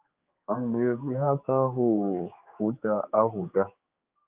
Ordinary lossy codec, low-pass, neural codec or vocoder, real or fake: Opus, 24 kbps; 3.6 kHz; codec, 16 kHz, 4 kbps, X-Codec, HuBERT features, trained on general audio; fake